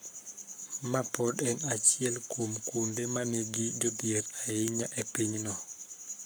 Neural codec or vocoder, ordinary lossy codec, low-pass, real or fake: codec, 44.1 kHz, 7.8 kbps, Pupu-Codec; none; none; fake